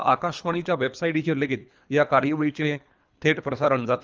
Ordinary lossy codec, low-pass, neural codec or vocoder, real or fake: Opus, 24 kbps; 7.2 kHz; codec, 24 kHz, 3 kbps, HILCodec; fake